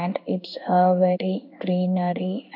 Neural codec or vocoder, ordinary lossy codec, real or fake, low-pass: codec, 16 kHz in and 24 kHz out, 1 kbps, XY-Tokenizer; none; fake; 5.4 kHz